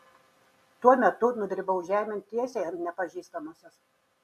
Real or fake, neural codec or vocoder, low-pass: real; none; 14.4 kHz